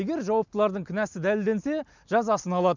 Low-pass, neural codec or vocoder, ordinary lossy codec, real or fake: 7.2 kHz; none; none; real